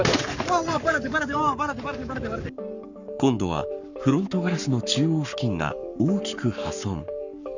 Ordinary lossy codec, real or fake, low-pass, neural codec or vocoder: none; fake; 7.2 kHz; codec, 44.1 kHz, 7.8 kbps, Pupu-Codec